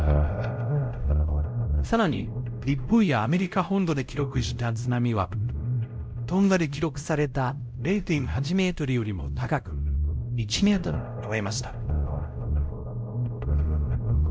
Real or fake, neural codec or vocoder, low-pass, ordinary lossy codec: fake; codec, 16 kHz, 0.5 kbps, X-Codec, WavLM features, trained on Multilingual LibriSpeech; none; none